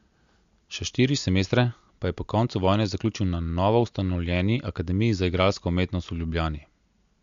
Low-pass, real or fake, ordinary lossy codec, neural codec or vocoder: 7.2 kHz; real; MP3, 64 kbps; none